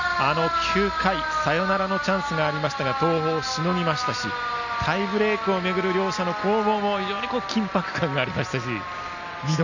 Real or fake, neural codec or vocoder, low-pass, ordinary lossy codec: real; none; 7.2 kHz; none